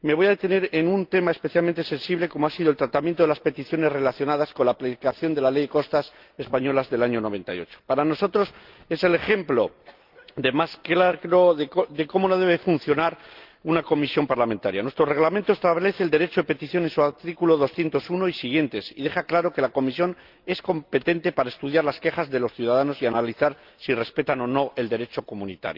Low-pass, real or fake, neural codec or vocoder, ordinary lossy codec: 5.4 kHz; real; none; Opus, 32 kbps